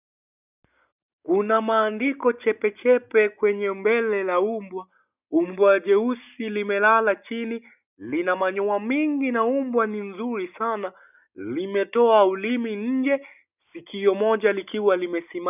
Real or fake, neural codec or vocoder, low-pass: real; none; 3.6 kHz